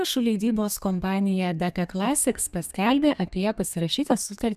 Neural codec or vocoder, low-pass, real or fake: codec, 32 kHz, 1.9 kbps, SNAC; 14.4 kHz; fake